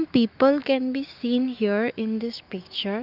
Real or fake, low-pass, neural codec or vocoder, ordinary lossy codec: fake; 5.4 kHz; autoencoder, 48 kHz, 128 numbers a frame, DAC-VAE, trained on Japanese speech; Opus, 24 kbps